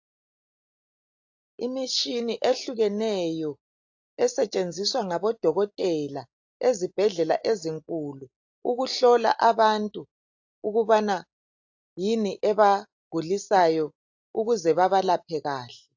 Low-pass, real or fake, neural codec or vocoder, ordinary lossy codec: 7.2 kHz; real; none; AAC, 48 kbps